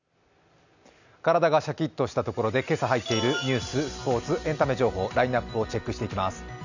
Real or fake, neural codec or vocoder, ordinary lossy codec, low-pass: real; none; none; 7.2 kHz